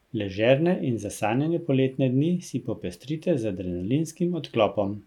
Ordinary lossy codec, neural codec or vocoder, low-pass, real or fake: none; none; 19.8 kHz; real